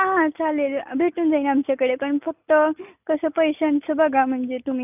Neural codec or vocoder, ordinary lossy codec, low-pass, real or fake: none; none; 3.6 kHz; real